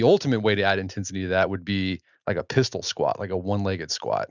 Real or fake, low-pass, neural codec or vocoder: real; 7.2 kHz; none